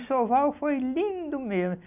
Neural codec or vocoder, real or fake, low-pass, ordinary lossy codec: none; real; 3.6 kHz; none